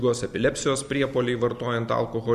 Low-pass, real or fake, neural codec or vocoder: 14.4 kHz; real; none